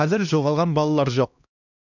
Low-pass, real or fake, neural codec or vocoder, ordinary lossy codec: 7.2 kHz; fake; codec, 16 kHz, 1 kbps, X-Codec, HuBERT features, trained on LibriSpeech; none